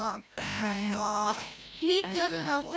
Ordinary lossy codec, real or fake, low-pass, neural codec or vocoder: none; fake; none; codec, 16 kHz, 0.5 kbps, FreqCodec, larger model